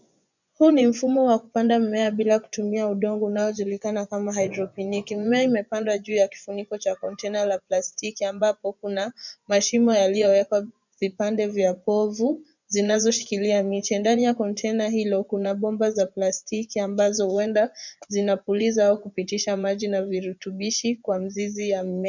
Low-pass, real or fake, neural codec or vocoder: 7.2 kHz; fake; vocoder, 24 kHz, 100 mel bands, Vocos